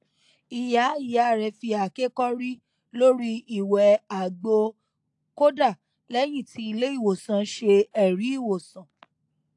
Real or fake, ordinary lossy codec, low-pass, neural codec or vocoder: real; AAC, 64 kbps; 10.8 kHz; none